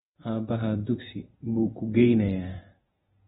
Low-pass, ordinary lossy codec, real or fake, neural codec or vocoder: 19.8 kHz; AAC, 16 kbps; fake; vocoder, 48 kHz, 128 mel bands, Vocos